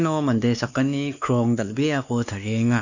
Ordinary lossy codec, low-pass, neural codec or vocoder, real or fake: none; 7.2 kHz; codec, 16 kHz, 2 kbps, X-Codec, WavLM features, trained on Multilingual LibriSpeech; fake